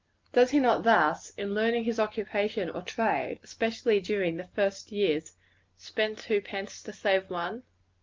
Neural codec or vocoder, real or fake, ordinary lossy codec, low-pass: none; real; Opus, 24 kbps; 7.2 kHz